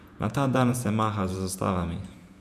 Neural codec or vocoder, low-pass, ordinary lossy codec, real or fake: vocoder, 48 kHz, 128 mel bands, Vocos; 14.4 kHz; none; fake